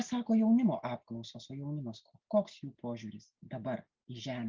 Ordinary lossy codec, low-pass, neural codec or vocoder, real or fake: Opus, 24 kbps; 7.2 kHz; none; real